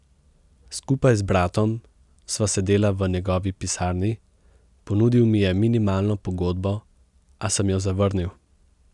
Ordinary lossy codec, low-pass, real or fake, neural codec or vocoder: none; 10.8 kHz; real; none